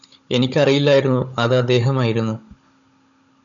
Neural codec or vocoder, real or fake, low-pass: codec, 16 kHz, 16 kbps, FunCodec, trained on Chinese and English, 50 frames a second; fake; 7.2 kHz